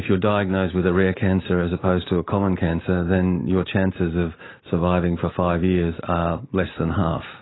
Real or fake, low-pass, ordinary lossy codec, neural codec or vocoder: real; 7.2 kHz; AAC, 16 kbps; none